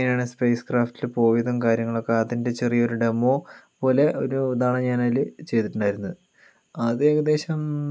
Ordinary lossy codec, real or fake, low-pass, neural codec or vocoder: none; real; none; none